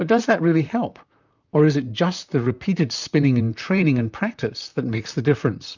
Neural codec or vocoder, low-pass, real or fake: vocoder, 44.1 kHz, 128 mel bands, Pupu-Vocoder; 7.2 kHz; fake